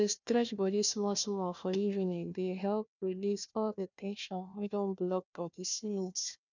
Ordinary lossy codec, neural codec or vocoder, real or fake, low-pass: none; codec, 16 kHz, 1 kbps, FunCodec, trained on Chinese and English, 50 frames a second; fake; 7.2 kHz